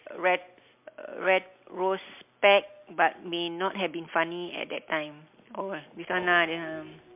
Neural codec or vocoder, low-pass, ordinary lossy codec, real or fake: none; 3.6 kHz; MP3, 32 kbps; real